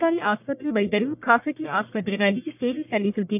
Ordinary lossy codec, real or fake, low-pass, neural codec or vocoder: none; fake; 3.6 kHz; codec, 44.1 kHz, 1.7 kbps, Pupu-Codec